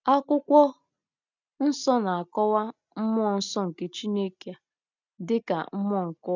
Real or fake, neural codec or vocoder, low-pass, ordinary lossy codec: real; none; 7.2 kHz; none